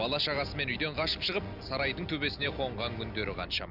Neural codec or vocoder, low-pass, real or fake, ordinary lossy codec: none; 5.4 kHz; real; none